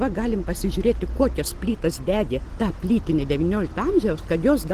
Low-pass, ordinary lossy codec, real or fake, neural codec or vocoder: 14.4 kHz; Opus, 16 kbps; real; none